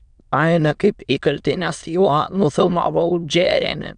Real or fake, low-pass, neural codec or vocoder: fake; 9.9 kHz; autoencoder, 22.05 kHz, a latent of 192 numbers a frame, VITS, trained on many speakers